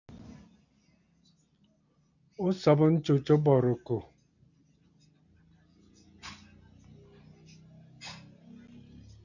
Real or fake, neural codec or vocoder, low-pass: real; none; 7.2 kHz